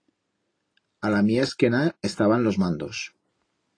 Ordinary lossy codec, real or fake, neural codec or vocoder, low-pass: AAC, 32 kbps; real; none; 9.9 kHz